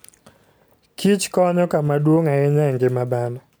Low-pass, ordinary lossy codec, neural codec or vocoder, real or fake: none; none; none; real